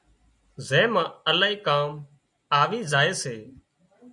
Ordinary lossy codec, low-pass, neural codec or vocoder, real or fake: MP3, 96 kbps; 10.8 kHz; none; real